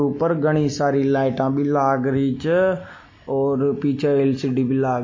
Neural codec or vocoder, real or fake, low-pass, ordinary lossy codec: none; real; 7.2 kHz; MP3, 32 kbps